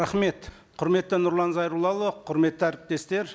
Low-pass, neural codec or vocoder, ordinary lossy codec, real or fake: none; none; none; real